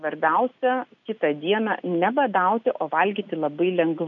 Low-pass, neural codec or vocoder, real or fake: 7.2 kHz; none; real